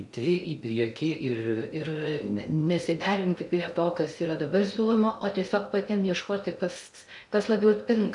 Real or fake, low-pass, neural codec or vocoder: fake; 10.8 kHz; codec, 16 kHz in and 24 kHz out, 0.6 kbps, FocalCodec, streaming, 2048 codes